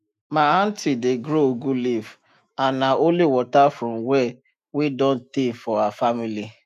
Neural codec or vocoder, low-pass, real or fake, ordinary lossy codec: autoencoder, 48 kHz, 128 numbers a frame, DAC-VAE, trained on Japanese speech; 14.4 kHz; fake; none